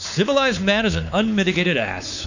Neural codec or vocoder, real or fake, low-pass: codec, 16 kHz, 4 kbps, X-Codec, WavLM features, trained on Multilingual LibriSpeech; fake; 7.2 kHz